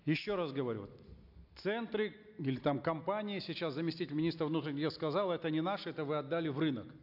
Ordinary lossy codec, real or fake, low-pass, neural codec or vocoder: AAC, 48 kbps; real; 5.4 kHz; none